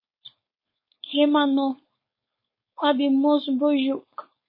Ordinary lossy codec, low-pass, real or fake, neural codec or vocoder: MP3, 24 kbps; 5.4 kHz; fake; codec, 16 kHz, 4.8 kbps, FACodec